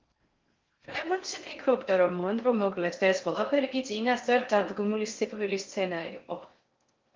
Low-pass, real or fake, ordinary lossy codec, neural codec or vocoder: 7.2 kHz; fake; Opus, 24 kbps; codec, 16 kHz in and 24 kHz out, 0.6 kbps, FocalCodec, streaming, 4096 codes